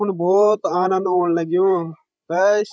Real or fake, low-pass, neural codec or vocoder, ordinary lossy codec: fake; none; codec, 16 kHz, 16 kbps, FreqCodec, larger model; none